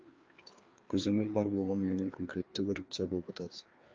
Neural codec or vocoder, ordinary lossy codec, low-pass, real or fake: codec, 16 kHz, 2 kbps, FreqCodec, larger model; Opus, 24 kbps; 7.2 kHz; fake